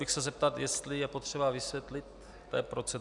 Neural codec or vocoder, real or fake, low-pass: none; real; 10.8 kHz